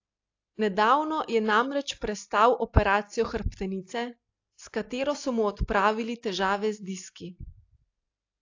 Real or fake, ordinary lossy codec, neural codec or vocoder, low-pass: real; AAC, 48 kbps; none; 7.2 kHz